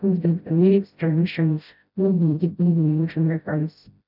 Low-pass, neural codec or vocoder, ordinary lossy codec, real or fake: 5.4 kHz; codec, 16 kHz, 0.5 kbps, FreqCodec, smaller model; none; fake